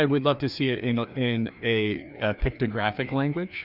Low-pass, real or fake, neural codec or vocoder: 5.4 kHz; fake; codec, 16 kHz, 2 kbps, FreqCodec, larger model